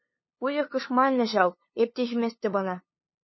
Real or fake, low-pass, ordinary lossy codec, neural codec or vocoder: fake; 7.2 kHz; MP3, 24 kbps; codec, 16 kHz, 2 kbps, FunCodec, trained on LibriTTS, 25 frames a second